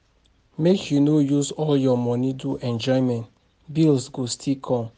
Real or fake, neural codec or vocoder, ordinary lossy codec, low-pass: real; none; none; none